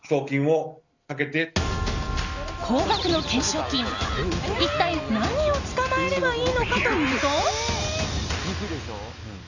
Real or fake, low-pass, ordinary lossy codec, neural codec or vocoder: real; 7.2 kHz; none; none